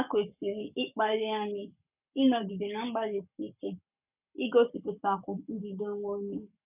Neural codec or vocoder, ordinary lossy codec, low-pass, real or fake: codec, 44.1 kHz, 7.8 kbps, Pupu-Codec; none; 3.6 kHz; fake